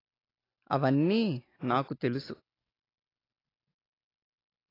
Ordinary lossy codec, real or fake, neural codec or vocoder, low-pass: AAC, 24 kbps; real; none; 5.4 kHz